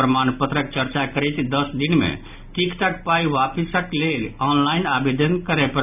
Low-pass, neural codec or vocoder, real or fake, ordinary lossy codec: 3.6 kHz; none; real; none